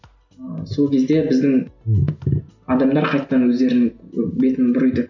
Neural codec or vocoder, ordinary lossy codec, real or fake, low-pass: none; none; real; 7.2 kHz